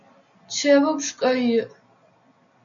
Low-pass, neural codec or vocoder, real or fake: 7.2 kHz; none; real